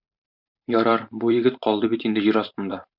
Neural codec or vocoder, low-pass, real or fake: vocoder, 44.1 kHz, 128 mel bands every 512 samples, BigVGAN v2; 5.4 kHz; fake